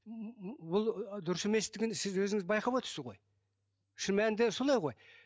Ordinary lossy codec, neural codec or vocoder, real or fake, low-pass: none; none; real; none